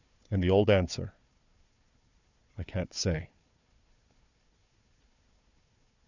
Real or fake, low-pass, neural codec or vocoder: fake; 7.2 kHz; codec, 16 kHz, 4 kbps, FunCodec, trained on Chinese and English, 50 frames a second